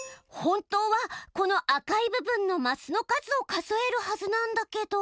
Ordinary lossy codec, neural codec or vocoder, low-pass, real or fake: none; none; none; real